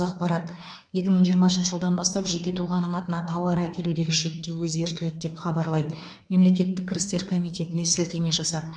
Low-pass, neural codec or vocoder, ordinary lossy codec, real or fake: 9.9 kHz; codec, 24 kHz, 1 kbps, SNAC; none; fake